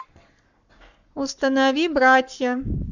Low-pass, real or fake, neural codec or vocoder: 7.2 kHz; fake; codec, 44.1 kHz, 7.8 kbps, Pupu-Codec